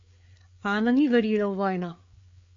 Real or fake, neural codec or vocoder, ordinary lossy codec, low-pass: fake; codec, 16 kHz, 4 kbps, FreqCodec, larger model; MP3, 48 kbps; 7.2 kHz